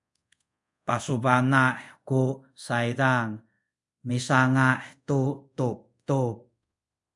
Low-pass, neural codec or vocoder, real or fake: 10.8 kHz; codec, 24 kHz, 0.5 kbps, DualCodec; fake